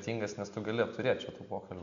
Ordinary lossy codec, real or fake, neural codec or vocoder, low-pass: MP3, 64 kbps; real; none; 7.2 kHz